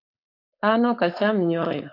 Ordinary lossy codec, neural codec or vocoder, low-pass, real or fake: AAC, 32 kbps; codec, 16 kHz, 4.8 kbps, FACodec; 5.4 kHz; fake